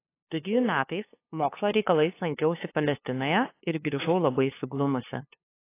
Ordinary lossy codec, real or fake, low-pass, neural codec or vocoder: AAC, 24 kbps; fake; 3.6 kHz; codec, 16 kHz, 2 kbps, FunCodec, trained on LibriTTS, 25 frames a second